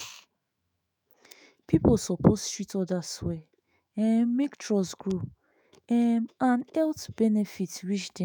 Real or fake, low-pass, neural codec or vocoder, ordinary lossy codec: fake; none; autoencoder, 48 kHz, 128 numbers a frame, DAC-VAE, trained on Japanese speech; none